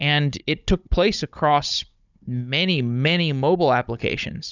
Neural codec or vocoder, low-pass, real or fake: codec, 16 kHz, 16 kbps, FunCodec, trained on Chinese and English, 50 frames a second; 7.2 kHz; fake